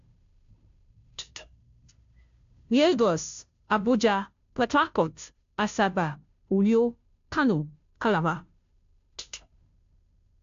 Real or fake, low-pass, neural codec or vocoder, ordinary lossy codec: fake; 7.2 kHz; codec, 16 kHz, 0.5 kbps, FunCodec, trained on Chinese and English, 25 frames a second; AAC, 64 kbps